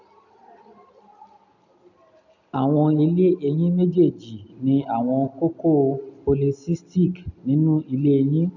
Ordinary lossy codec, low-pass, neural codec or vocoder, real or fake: none; 7.2 kHz; none; real